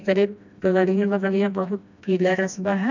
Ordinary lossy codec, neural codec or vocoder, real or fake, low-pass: none; codec, 16 kHz, 1 kbps, FreqCodec, smaller model; fake; 7.2 kHz